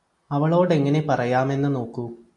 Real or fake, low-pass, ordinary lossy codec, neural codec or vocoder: real; 10.8 kHz; AAC, 64 kbps; none